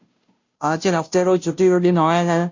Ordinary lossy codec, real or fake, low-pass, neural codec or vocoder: MP3, 48 kbps; fake; 7.2 kHz; codec, 16 kHz, 0.5 kbps, FunCodec, trained on Chinese and English, 25 frames a second